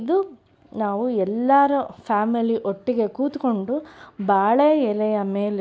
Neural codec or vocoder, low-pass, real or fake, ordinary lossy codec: none; none; real; none